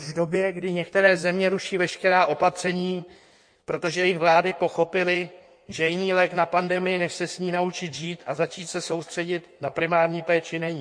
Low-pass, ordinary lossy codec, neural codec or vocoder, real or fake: 9.9 kHz; MP3, 48 kbps; codec, 16 kHz in and 24 kHz out, 1.1 kbps, FireRedTTS-2 codec; fake